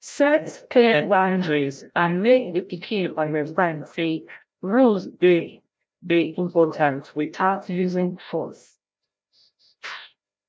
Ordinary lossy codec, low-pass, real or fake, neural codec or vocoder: none; none; fake; codec, 16 kHz, 0.5 kbps, FreqCodec, larger model